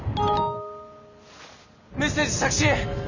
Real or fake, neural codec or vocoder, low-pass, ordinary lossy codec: real; none; 7.2 kHz; none